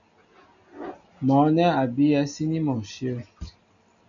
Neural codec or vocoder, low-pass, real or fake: none; 7.2 kHz; real